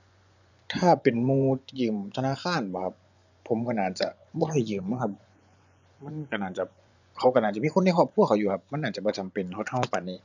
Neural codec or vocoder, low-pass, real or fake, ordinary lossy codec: vocoder, 24 kHz, 100 mel bands, Vocos; 7.2 kHz; fake; none